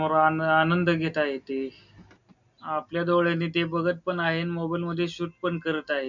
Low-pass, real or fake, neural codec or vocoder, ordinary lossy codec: 7.2 kHz; real; none; none